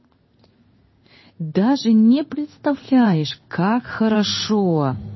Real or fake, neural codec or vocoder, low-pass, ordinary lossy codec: fake; codec, 16 kHz in and 24 kHz out, 1 kbps, XY-Tokenizer; 7.2 kHz; MP3, 24 kbps